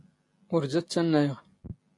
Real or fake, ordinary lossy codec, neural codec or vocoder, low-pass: real; AAC, 48 kbps; none; 10.8 kHz